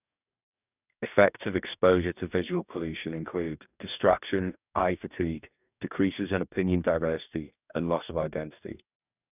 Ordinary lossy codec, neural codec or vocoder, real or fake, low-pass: none; codec, 44.1 kHz, 2.6 kbps, DAC; fake; 3.6 kHz